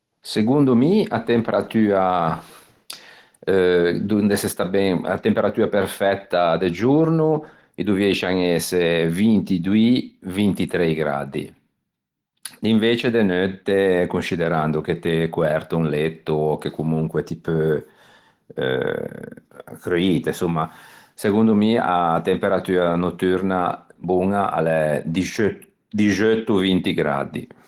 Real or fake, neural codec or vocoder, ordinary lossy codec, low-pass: real; none; Opus, 16 kbps; 19.8 kHz